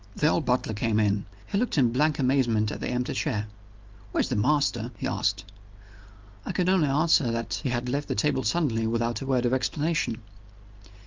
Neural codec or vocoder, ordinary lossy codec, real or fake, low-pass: none; Opus, 24 kbps; real; 7.2 kHz